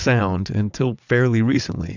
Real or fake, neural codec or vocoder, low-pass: fake; vocoder, 22.05 kHz, 80 mel bands, WaveNeXt; 7.2 kHz